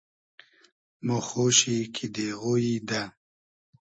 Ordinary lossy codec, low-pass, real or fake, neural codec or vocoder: MP3, 32 kbps; 9.9 kHz; real; none